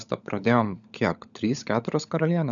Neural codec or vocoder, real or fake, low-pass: codec, 16 kHz, 16 kbps, FunCodec, trained on LibriTTS, 50 frames a second; fake; 7.2 kHz